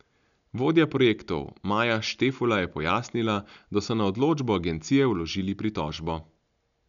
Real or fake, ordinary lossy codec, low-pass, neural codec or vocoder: real; none; 7.2 kHz; none